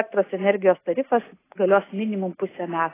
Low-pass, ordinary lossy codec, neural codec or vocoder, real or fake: 3.6 kHz; AAC, 16 kbps; none; real